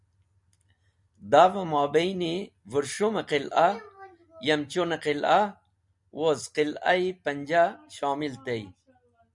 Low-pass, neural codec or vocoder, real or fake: 10.8 kHz; none; real